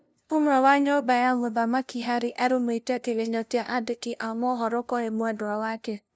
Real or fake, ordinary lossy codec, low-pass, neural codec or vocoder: fake; none; none; codec, 16 kHz, 0.5 kbps, FunCodec, trained on LibriTTS, 25 frames a second